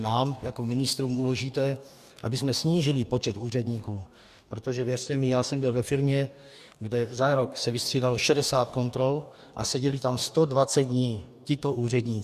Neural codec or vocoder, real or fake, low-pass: codec, 44.1 kHz, 2.6 kbps, DAC; fake; 14.4 kHz